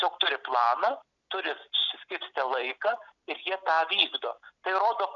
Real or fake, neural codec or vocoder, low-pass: real; none; 7.2 kHz